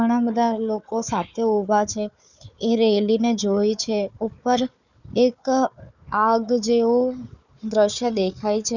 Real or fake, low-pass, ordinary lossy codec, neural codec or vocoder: fake; 7.2 kHz; none; codec, 16 kHz, 4 kbps, FunCodec, trained on Chinese and English, 50 frames a second